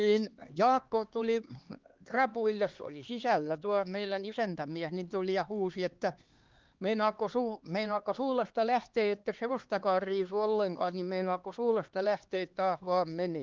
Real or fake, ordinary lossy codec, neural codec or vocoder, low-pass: fake; Opus, 32 kbps; codec, 16 kHz, 2 kbps, X-Codec, HuBERT features, trained on LibriSpeech; 7.2 kHz